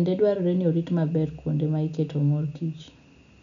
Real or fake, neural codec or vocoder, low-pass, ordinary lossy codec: real; none; 7.2 kHz; none